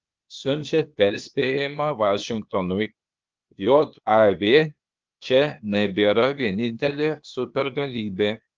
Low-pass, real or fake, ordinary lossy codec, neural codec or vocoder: 7.2 kHz; fake; Opus, 24 kbps; codec, 16 kHz, 0.8 kbps, ZipCodec